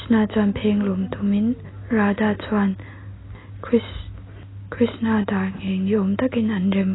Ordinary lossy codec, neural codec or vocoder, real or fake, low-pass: AAC, 16 kbps; none; real; 7.2 kHz